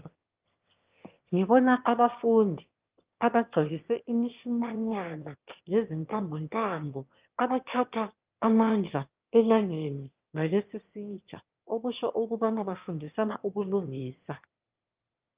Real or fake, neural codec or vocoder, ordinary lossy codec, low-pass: fake; autoencoder, 22.05 kHz, a latent of 192 numbers a frame, VITS, trained on one speaker; Opus, 24 kbps; 3.6 kHz